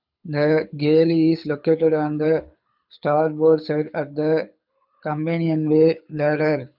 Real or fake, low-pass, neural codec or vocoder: fake; 5.4 kHz; codec, 24 kHz, 6 kbps, HILCodec